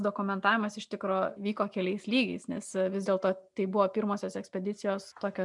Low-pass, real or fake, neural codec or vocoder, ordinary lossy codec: 10.8 kHz; real; none; MP3, 96 kbps